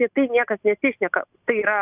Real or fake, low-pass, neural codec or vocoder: real; 3.6 kHz; none